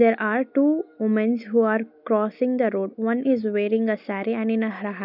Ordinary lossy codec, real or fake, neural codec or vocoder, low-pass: none; real; none; 5.4 kHz